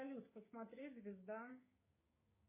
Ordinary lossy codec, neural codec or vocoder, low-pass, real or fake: MP3, 16 kbps; codec, 16 kHz, 6 kbps, DAC; 3.6 kHz; fake